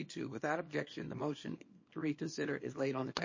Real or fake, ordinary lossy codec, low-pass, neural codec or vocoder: fake; MP3, 32 kbps; 7.2 kHz; codec, 24 kHz, 0.9 kbps, WavTokenizer, small release